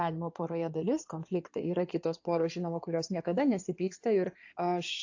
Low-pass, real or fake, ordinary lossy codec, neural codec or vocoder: 7.2 kHz; fake; AAC, 48 kbps; codec, 16 kHz in and 24 kHz out, 2.2 kbps, FireRedTTS-2 codec